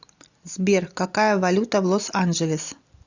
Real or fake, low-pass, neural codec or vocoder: real; 7.2 kHz; none